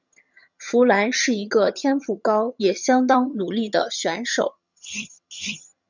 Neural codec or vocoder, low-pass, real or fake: vocoder, 22.05 kHz, 80 mel bands, HiFi-GAN; 7.2 kHz; fake